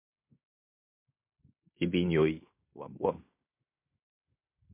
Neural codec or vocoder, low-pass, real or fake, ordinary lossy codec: codec, 16 kHz in and 24 kHz out, 0.9 kbps, LongCat-Audio-Codec, fine tuned four codebook decoder; 3.6 kHz; fake; MP3, 32 kbps